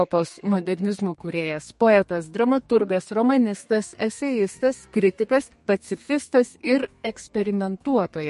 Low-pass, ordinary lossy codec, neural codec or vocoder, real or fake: 14.4 kHz; MP3, 48 kbps; codec, 32 kHz, 1.9 kbps, SNAC; fake